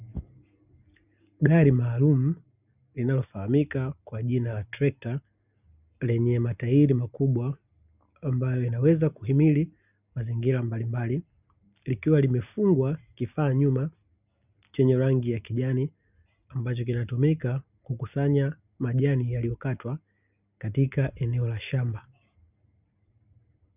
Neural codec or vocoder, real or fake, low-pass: none; real; 3.6 kHz